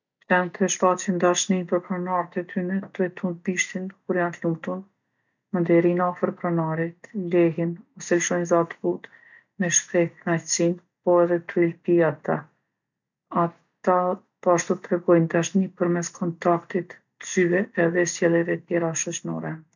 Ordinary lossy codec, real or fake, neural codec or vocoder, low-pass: none; real; none; 7.2 kHz